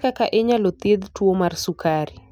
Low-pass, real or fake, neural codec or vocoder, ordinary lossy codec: 19.8 kHz; real; none; none